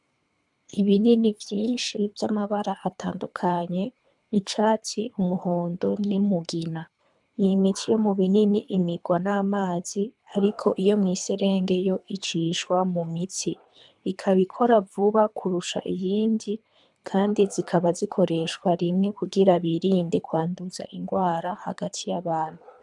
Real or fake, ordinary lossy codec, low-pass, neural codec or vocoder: fake; MP3, 96 kbps; 10.8 kHz; codec, 24 kHz, 3 kbps, HILCodec